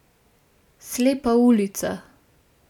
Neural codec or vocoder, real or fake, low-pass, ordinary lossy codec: none; real; 19.8 kHz; none